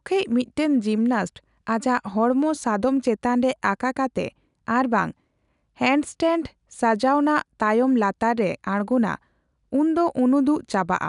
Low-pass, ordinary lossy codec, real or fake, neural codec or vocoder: 10.8 kHz; none; real; none